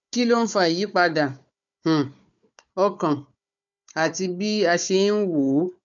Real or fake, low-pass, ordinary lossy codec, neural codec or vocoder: fake; 7.2 kHz; none; codec, 16 kHz, 4 kbps, FunCodec, trained on Chinese and English, 50 frames a second